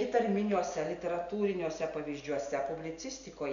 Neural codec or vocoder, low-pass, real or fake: none; 7.2 kHz; real